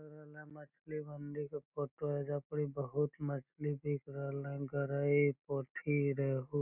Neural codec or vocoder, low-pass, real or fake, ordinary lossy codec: none; 3.6 kHz; real; none